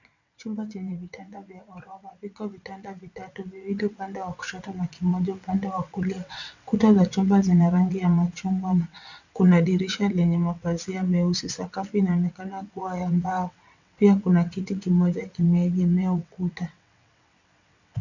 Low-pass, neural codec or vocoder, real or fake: 7.2 kHz; vocoder, 22.05 kHz, 80 mel bands, WaveNeXt; fake